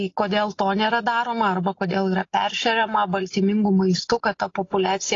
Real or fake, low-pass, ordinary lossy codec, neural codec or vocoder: real; 7.2 kHz; AAC, 32 kbps; none